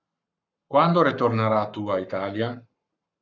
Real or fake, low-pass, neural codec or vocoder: fake; 7.2 kHz; codec, 44.1 kHz, 7.8 kbps, Pupu-Codec